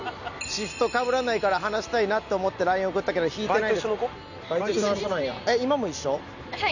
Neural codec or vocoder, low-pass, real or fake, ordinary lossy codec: none; 7.2 kHz; real; none